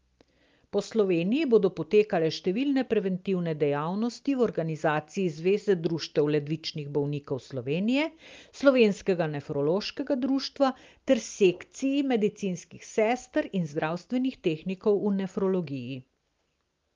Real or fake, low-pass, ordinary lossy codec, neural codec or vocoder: real; 7.2 kHz; Opus, 32 kbps; none